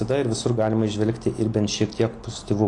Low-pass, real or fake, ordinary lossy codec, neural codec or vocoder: 10.8 kHz; real; AAC, 48 kbps; none